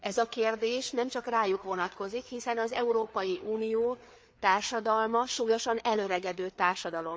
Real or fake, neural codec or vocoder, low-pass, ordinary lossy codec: fake; codec, 16 kHz, 4 kbps, FreqCodec, larger model; none; none